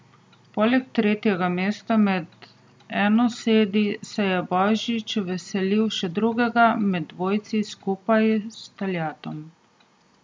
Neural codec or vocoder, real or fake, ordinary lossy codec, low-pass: none; real; none; none